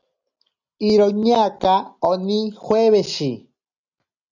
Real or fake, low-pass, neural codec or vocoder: real; 7.2 kHz; none